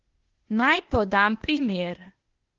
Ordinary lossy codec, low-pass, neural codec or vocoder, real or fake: Opus, 16 kbps; 7.2 kHz; codec, 16 kHz, 0.8 kbps, ZipCodec; fake